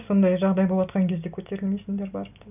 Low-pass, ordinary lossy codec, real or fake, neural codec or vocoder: 3.6 kHz; none; real; none